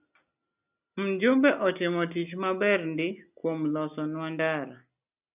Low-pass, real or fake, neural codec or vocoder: 3.6 kHz; real; none